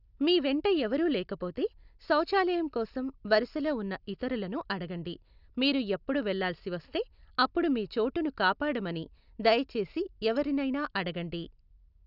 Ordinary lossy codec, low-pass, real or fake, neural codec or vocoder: none; 5.4 kHz; real; none